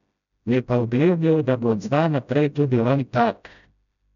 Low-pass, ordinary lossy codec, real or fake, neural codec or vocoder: 7.2 kHz; none; fake; codec, 16 kHz, 0.5 kbps, FreqCodec, smaller model